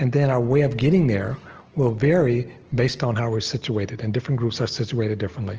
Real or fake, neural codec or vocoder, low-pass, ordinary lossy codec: real; none; 7.2 kHz; Opus, 16 kbps